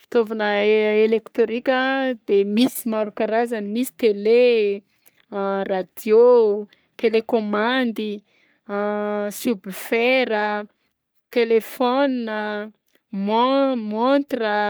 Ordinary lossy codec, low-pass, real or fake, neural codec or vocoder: none; none; fake; codec, 44.1 kHz, 3.4 kbps, Pupu-Codec